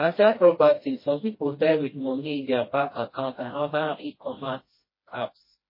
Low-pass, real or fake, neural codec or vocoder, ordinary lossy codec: 5.4 kHz; fake; codec, 16 kHz, 1 kbps, FreqCodec, smaller model; MP3, 24 kbps